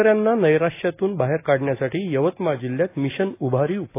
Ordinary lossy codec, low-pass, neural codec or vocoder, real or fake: AAC, 24 kbps; 3.6 kHz; none; real